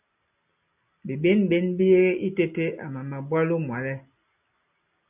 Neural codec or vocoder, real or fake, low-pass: none; real; 3.6 kHz